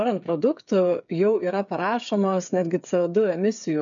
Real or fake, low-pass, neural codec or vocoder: fake; 7.2 kHz; codec, 16 kHz, 8 kbps, FreqCodec, smaller model